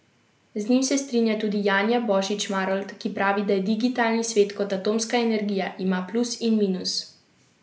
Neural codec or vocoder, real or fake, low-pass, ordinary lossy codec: none; real; none; none